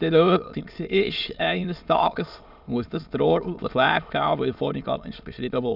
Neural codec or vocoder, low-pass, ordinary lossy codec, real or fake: autoencoder, 22.05 kHz, a latent of 192 numbers a frame, VITS, trained on many speakers; 5.4 kHz; none; fake